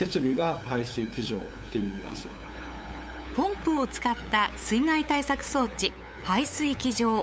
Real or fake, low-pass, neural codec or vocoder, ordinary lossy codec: fake; none; codec, 16 kHz, 8 kbps, FunCodec, trained on LibriTTS, 25 frames a second; none